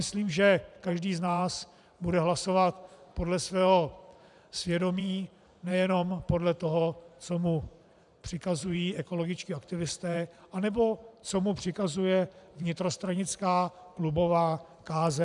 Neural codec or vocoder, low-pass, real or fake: vocoder, 44.1 kHz, 128 mel bands every 512 samples, BigVGAN v2; 10.8 kHz; fake